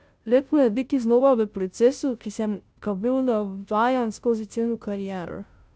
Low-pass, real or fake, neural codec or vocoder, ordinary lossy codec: none; fake; codec, 16 kHz, 0.5 kbps, FunCodec, trained on Chinese and English, 25 frames a second; none